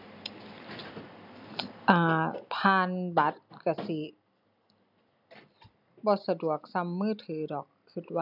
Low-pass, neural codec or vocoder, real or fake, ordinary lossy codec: 5.4 kHz; none; real; none